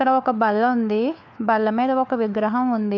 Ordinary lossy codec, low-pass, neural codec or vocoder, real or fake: none; 7.2 kHz; codec, 16 kHz, 4 kbps, FunCodec, trained on LibriTTS, 50 frames a second; fake